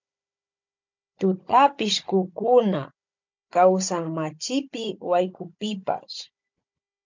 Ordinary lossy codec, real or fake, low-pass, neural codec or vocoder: MP3, 64 kbps; fake; 7.2 kHz; codec, 16 kHz, 16 kbps, FunCodec, trained on Chinese and English, 50 frames a second